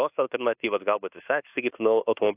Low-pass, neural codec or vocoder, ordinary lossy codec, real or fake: 3.6 kHz; codec, 24 kHz, 1.2 kbps, DualCodec; AAC, 24 kbps; fake